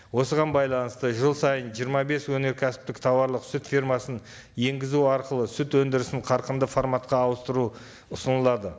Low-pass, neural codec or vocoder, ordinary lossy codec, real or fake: none; none; none; real